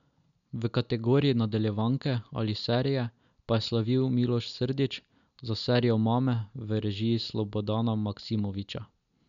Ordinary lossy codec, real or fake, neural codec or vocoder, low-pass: none; real; none; 7.2 kHz